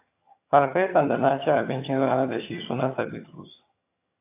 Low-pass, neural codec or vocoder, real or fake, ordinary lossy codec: 3.6 kHz; vocoder, 22.05 kHz, 80 mel bands, HiFi-GAN; fake; AAC, 24 kbps